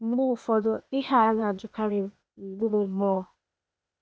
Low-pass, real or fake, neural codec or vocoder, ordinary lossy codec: none; fake; codec, 16 kHz, 0.8 kbps, ZipCodec; none